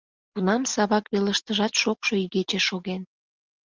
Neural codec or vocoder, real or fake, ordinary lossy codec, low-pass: none; real; Opus, 32 kbps; 7.2 kHz